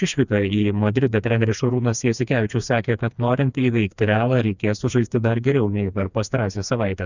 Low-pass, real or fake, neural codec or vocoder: 7.2 kHz; fake; codec, 16 kHz, 2 kbps, FreqCodec, smaller model